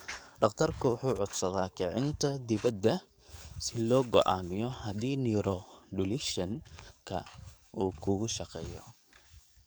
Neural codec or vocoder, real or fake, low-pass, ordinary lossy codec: codec, 44.1 kHz, 7.8 kbps, DAC; fake; none; none